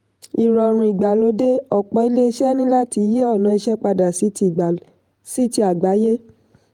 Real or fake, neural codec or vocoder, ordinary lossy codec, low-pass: fake; vocoder, 48 kHz, 128 mel bands, Vocos; Opus, 32 kbps; 19.8 kHz